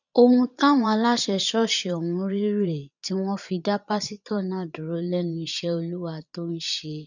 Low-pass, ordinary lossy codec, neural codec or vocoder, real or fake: 7.2 kHz; none; vocoder, 22.05 kHz, 80 mel bands, Vocos; fake